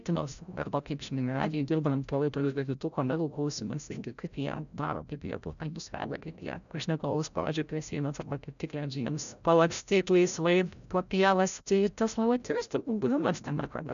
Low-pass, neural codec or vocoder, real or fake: 7.2 kHz; codec, 16 kHz, 0.5 kbps, FreqCodec, larger model; fake